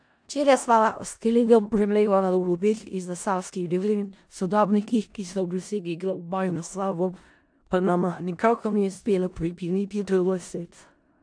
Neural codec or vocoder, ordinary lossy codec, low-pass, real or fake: codec, 16 kHz in and 24 kHz out, 0.4 kbps, LongCat-Audio-Codec, four codebook decoder; AAC, 64 kbps; 9.9 kHz; fake